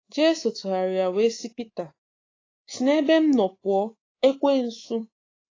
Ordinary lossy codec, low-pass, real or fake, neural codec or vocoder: AAC, 32 kbps; 7.2 kHz; fake; codec, 24 kHz, 3.1 kbps, DualCodec